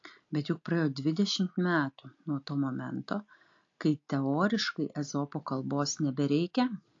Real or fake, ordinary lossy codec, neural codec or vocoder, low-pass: real; AAC, 48 kbps; none; 7.2 kHz